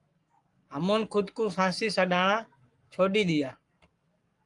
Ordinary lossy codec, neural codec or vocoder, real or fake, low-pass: Opus, 32 kbps; codec, 44.1 kHz, 7.8 kbps, Pupu-Codec; fake; 10.8 kHz